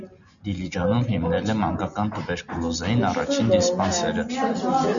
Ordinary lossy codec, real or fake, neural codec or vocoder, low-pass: MP3, 96 kbps; real; none; 7.2 kHz